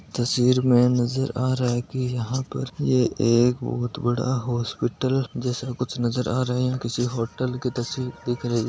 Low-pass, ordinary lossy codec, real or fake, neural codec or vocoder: none; none; real; none